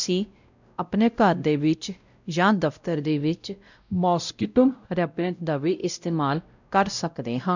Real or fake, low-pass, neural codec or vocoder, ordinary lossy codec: fake; 7.2 kHz; codec, 16 kHz, 0.5 kbps, X-Codec, WavLM features, trained on Multilingual LibriSpeech; none